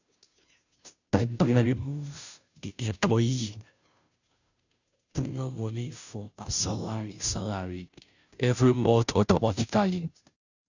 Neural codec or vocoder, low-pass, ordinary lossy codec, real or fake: codec, 16 kHz, 0.5 kbps, FunCodec, trained on Chinese and English, 25 frames a second; 7.2 kHz; none; fake